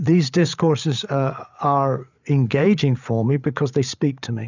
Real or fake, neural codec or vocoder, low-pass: fake; codec, 16 kHz, 8 kbps, FreqCodec, larger model; 7.2 kHz